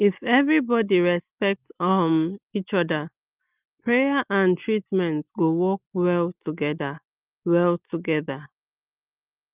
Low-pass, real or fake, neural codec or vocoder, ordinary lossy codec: 3.6 kHz; real; none; Opus, 32 kbps